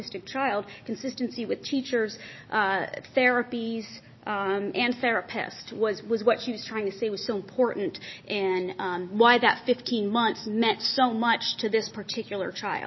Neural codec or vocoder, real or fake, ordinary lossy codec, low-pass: none; real; MP3, 24 kbps; 7.2 kHz